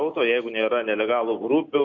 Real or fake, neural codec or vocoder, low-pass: real; none; 7.2 kHz